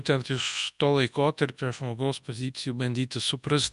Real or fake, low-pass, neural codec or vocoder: fake; 10.8 kHz; codec, 24 kHz, 0.9 kbps, WavTokenizer, large speech release